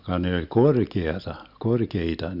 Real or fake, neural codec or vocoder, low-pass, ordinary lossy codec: real; none; 5.4 kHz; none